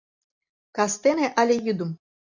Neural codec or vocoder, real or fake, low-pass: none; real; 7.2 kHz